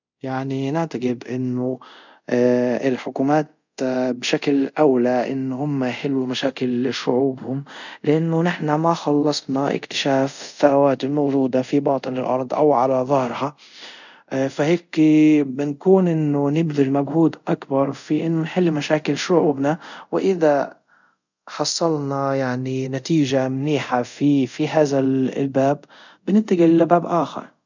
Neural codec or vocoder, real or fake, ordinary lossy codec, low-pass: codec, 24 kHz, 0.5 kbps, DualCodec; fake; none; 7.2 kHz